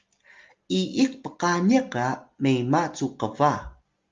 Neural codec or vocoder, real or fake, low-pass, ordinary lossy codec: none; real; 7.2 kHz; Opus, 32 kbps